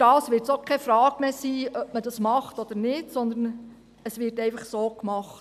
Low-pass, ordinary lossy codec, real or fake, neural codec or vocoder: 14.4 kHz; AAC, 96 kbps; real; none